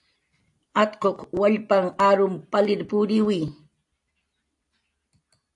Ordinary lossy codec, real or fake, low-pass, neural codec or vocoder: AAC, 64 kbps; real; 10.8 kHz; none